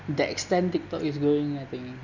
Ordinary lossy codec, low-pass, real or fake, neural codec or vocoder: none; 7.2 kHz; real; none